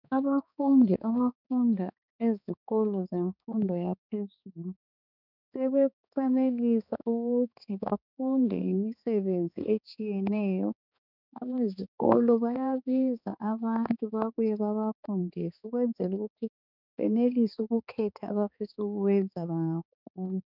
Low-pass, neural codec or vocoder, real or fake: 5.4 kHz; codec, 16 kHz, 4 kbps, X-Codec, HuBERT features, trained on balanced general audio; fake